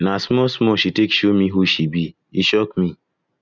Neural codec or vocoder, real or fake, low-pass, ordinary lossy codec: none; real; 7.2 kHz; none